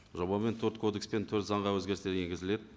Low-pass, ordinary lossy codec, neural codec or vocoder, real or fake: none; none; none; real